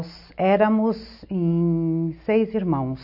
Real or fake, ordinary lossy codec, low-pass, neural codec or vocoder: real; none; 5.4 kHz; none